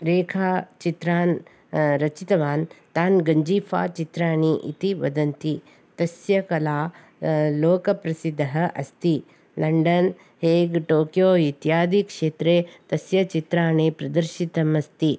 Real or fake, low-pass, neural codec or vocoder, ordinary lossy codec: real; none; none; none